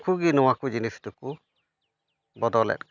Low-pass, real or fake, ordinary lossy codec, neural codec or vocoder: 7.2 kHz; real; none; none